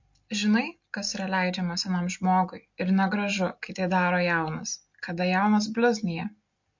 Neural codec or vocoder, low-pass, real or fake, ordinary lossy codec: none; 7.2 kHz; real; MP3, 48 kbps